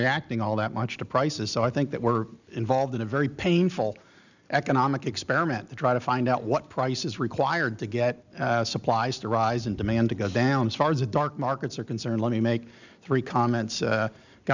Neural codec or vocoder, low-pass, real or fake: none; 7.2 kHz; real